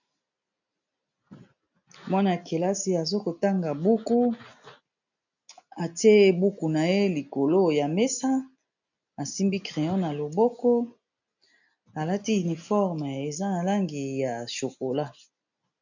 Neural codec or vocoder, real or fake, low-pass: none; real; 7.2 kHz